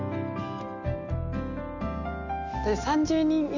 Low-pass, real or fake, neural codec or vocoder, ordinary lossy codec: 7.2 kHz; real; none; none